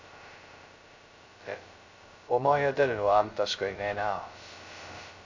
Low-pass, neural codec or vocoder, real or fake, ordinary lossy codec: 7.2 kHz; codec, 16 kHz, 0.2 kbps, FocalCodec; fake; MP3, 64 kbps